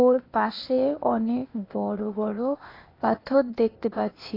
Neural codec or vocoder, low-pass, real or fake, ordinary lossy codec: codec, 16 kHz, 0.8 kbps, ZipCodec; 5.4 kHz; fake; AAC, 24 kbps